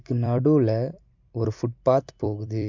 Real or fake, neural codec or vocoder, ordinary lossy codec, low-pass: fake; vocoder, 44.1 kHz, 128 mel bands every 256 samples, BigVGAN v2; MP3, 64 kbps; 7.2 kHz